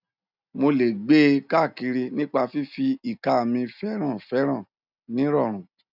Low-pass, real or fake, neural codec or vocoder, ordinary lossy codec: 5.4 kHz; real; none; none